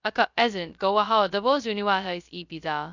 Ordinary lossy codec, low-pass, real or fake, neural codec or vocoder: none; 7.2 kHz; fake; codec, 16 kHz, 0.2 kbps, FocalCodec